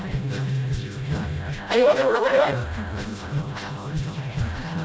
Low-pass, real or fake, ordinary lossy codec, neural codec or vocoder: none; fake; none; codec, 16 kHz, 0.5 kbps, FreqCodec, smaller model